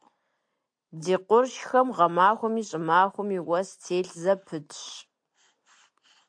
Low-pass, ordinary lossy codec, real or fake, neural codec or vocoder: 9.9 kHz; AAC, 64 kbps; real; none